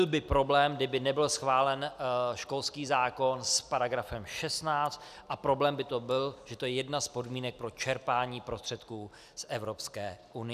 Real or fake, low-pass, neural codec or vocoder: real; 14.4 kHz; none